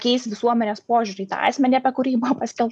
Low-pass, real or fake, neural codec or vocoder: 10.8 kHz; real; none